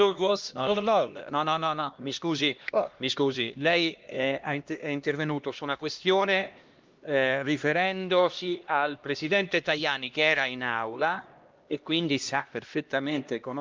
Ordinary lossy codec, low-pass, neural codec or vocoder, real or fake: Opus, 32 kbps; 7.2 kHz; codec, 16 kHz, 1 kbps, X-Codec, HuBERT features, trained on LibriSpeech; fake